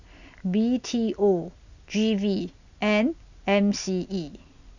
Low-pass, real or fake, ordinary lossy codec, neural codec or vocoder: 7.2 kHz; real; none; none